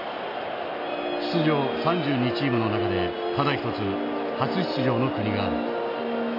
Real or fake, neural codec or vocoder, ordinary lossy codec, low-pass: real; none; MP3, 32 kbps; 5.4 kHz